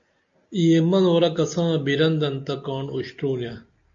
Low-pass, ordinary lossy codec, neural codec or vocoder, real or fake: 7.2 kHz; AAC, 64 kbps; none; real